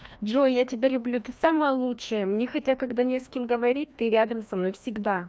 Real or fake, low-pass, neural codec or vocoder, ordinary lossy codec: fake; none; codec, 16 kHz, 1 kbps, FreqCodec, larger model; none